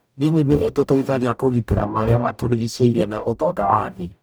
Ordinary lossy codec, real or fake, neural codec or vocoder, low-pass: none; fake; codec, 44.1 kHz, 0.9 kbps, DAC; none